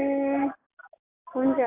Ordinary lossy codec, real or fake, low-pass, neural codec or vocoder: AAC, 32 kbps; real; 3.6 kHz; none